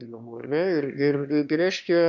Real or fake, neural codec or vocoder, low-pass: fake; autoencoder, 22.05 kHz, a latent of 192 numbers a frame, VITS, trained on one speaker; 7.2 kHz